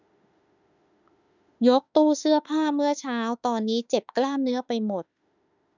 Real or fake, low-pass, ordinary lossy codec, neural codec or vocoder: fake; 7.2 kHz; none; autoencoder, 48 kHz, 32 numbers a frame, DAC-VAE, trained on Japanese speech